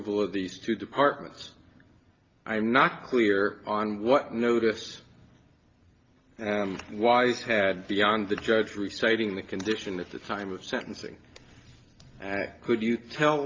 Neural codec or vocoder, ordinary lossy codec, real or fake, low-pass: none; Opus, 24 kbps; real; 7.2 kHz